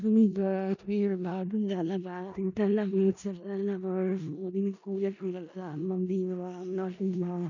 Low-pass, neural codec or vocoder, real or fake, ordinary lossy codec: 7.2 kHz; codec, 16 kHz in and 24 kHz out, 0.4 kbps, LongCat-Audio-Codec, four codebook decoder; fake; none